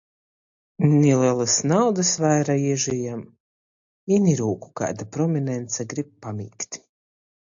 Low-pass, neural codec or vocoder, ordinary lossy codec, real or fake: 7.2 kHz; none; AAC, 64 kbps; real